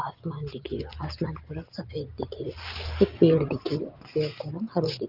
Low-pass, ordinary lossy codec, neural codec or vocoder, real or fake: 5.4 kHz; Opus, 32 kbps; none; real